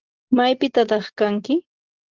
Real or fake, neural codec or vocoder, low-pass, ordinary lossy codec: real; none; 7.2 kHz; Opus, 16 kbps